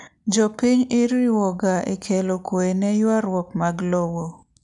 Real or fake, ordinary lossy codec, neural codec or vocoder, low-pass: real; none; none; 10.8 kHz